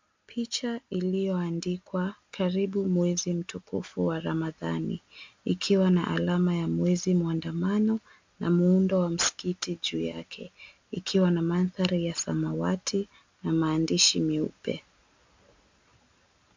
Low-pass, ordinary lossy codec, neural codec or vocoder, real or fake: 7.2 kHz; MP3, 64 kbps; none; real